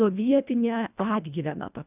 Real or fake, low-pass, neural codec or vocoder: fake; 3.6 kHz; codec, 24 kHz, 1.5 kbps, HILCodec